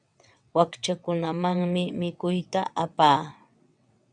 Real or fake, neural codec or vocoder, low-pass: fake; vocoder, 22.05 kHz, 80 mel bands, WaveNeXt; 9.9 kHz